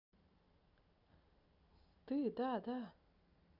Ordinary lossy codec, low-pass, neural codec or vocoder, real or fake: none; 5.4 kHz; none; real